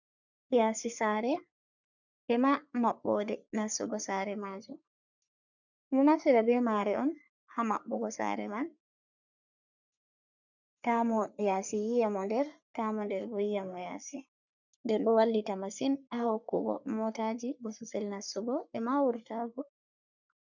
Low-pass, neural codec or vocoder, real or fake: 7.2 kHz; codec, 44.1 kHz, 3.4 kbps, Pupu-Codec; fake